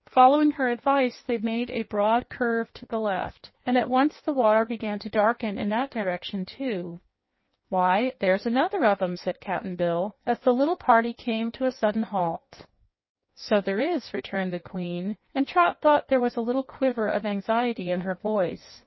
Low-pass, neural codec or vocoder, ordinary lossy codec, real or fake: 7.2 kHz; codec, 16 kHz in and 24 kHz out, 1.1 kbps, FireRedTTS-2 codec; MP3, 24 kbps; fake